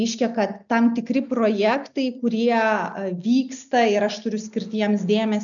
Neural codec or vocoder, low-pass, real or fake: none; 7.2 kHz; real